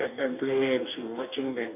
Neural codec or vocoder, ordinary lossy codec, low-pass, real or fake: codec, 44.1 kHz, 2.6 kbps, DAC; none; 3.6 kHz; fake